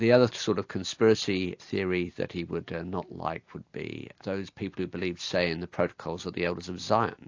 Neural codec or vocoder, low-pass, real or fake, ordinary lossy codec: none; 7.2 kHz; real; AAC, 48 kbps